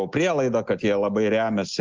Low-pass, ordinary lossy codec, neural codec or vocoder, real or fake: 7.2 kHz; Opus, 24 kbps; none; real